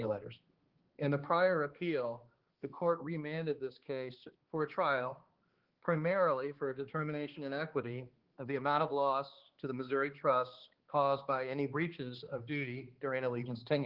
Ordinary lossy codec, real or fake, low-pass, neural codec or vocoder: Opus, 16 kbps; fake; 5.4 kHz; codec, 16 kHz, 2 kbps, X-Codec, HuBERT features, trained on balanced general audio